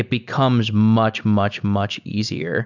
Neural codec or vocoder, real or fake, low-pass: none; real; 7.2 kHz